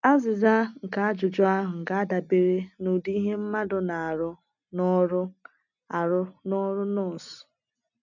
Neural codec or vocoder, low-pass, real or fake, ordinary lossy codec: none; 7.2 kHz; real; none